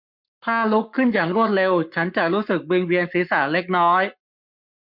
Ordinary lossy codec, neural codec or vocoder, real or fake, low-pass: MP3, 48 kbps; codec, 44.1 kHz, 7.8 kbps, Pupu-Codec; fake; 5.4 kHz